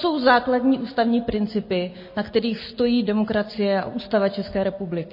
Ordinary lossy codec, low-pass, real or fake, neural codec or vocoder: MP3, 24 kbps; 5.4 kHz; real; none